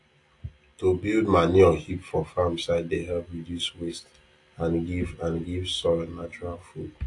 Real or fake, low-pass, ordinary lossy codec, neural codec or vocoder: real; 10.8 kHz; AAC, 48 kbps; none